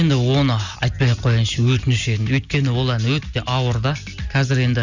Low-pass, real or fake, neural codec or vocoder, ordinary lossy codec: 7.2 kHz; real; none; Opus, 64 kbps